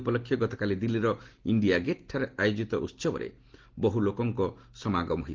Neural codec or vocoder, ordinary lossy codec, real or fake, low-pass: none; Opus, 16 kbps; real; 7.2 kHz